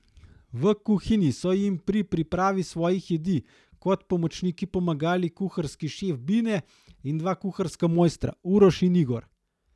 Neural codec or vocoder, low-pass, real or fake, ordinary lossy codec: none; none; real; none